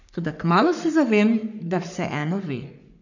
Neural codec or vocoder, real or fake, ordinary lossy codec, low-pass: codec, 44.1 kHz, 3.4 kbps, Pupu-Codec; fake; none; 7.2 kHz